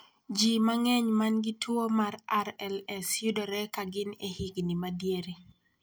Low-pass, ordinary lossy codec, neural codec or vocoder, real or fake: none; none; none; real